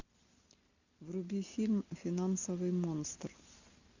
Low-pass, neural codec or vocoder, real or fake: 7.2 kHz; none; real